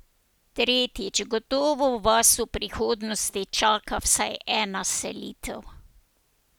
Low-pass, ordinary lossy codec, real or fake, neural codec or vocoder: none; none; real; none